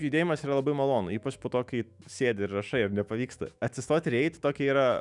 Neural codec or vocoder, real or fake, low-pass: autoencoder, 48 kHz, 128 numbers a frame, DAC-VAE, trained on Japanese speech; fake; 10.8 kHz